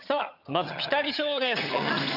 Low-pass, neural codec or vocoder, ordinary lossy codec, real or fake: 5.4 kHz; vocoder, 22.05 kHz, 80 mel bands, HiFi-GAN; none; fake